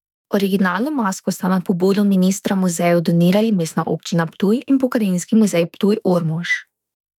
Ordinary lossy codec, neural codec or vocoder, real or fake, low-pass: none; autoencoder, 48 kHz, 32 numbers a frame, DAC-VAE, trained on Japanese speech; fake; 19.8 kHz